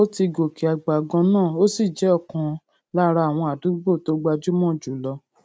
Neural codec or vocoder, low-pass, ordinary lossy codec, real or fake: none; none; none; real